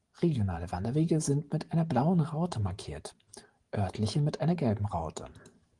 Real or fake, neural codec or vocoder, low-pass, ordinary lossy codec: fake; vocoder, 44.1 kHz, 128 mel bands every 512 samples, BigVGAN v2; 10.8 kHz; Opus, 24 kbps